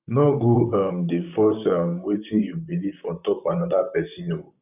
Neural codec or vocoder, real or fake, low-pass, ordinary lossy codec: vocoder, 44.1 kHz, 128 mel bands, Pupu-Vocoder; fake; 3.6 kHz; none